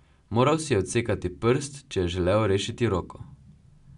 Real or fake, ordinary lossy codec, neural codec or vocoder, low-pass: real; none; none; 10.8 kHz